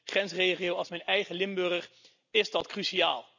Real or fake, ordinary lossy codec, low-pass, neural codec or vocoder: real; none; 7.2 kHz; none